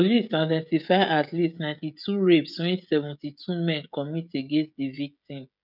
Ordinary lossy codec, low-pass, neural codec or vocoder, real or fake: none; 5.4 kHz; codec, 16 kHz, 16 kbps, FreqCodec, smaller model; fake